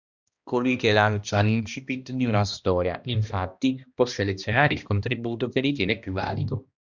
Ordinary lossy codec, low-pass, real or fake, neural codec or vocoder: Opus, 64 kbps; 7.2 kHz; fake; codec, 16 kHz, 1 kbps, X-Codec, HuBERT features, trained on balanced general audio